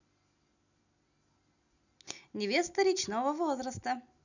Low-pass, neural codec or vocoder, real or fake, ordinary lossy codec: 7.2 kHz; none; real; none